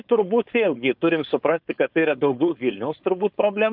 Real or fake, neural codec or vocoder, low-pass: fake; codec, 16 kHz, 4.8 kbps, FACodec; 5.4 kHz